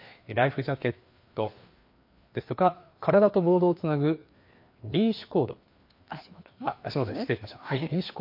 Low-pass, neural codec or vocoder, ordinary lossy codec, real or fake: 5.4 kHz; codec, 16 kHz, 2 kbps, FreqCodec, larger model; MP3, 32 kbps; fake